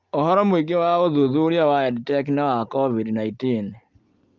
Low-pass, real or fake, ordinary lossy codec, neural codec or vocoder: 7.2 kHz; real; Opus, 32 kbps; none